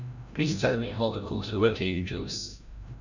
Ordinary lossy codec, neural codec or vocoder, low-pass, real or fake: none; codec, 16 kHz, 0.5 kbps, FreqCodec, larger model; 7.2 kHz; fake